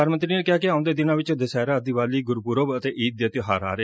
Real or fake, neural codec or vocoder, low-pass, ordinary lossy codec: real; none; none; none